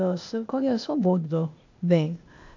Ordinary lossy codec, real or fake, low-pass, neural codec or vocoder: AAC, 48 kbps; fake; 7.2 kHz; codec, 16 kHz, 0.8 kbps, ZipCodec